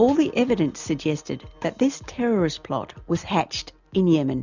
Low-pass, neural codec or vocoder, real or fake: 7.2 kHz; none; real